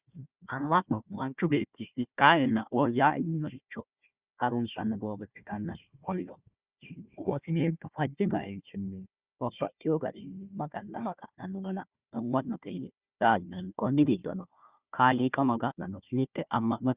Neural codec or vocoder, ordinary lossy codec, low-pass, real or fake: codec, 16 kHz, 1 kbps, FunCodec, trained on Chinese and English, 50 frames a second; Opus, 64 kbps; 3.6 kHz; fake